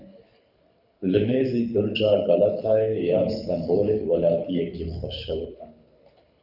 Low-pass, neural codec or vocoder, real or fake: 5.4 kHz; codec, 24 kHz, 6 kbps, HILCodec; fake